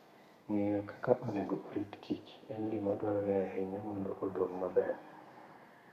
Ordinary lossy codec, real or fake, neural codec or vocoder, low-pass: Opus, 64 kbps; fake; codec, 32 kHz, 1.9 kbps, SNAC; 14.4 kHz